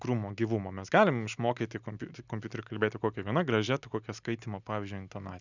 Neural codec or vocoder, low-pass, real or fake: none; 7.2 kHz; real